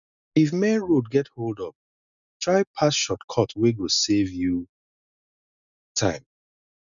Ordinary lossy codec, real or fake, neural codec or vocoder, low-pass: none; real; none; 7.2 kHz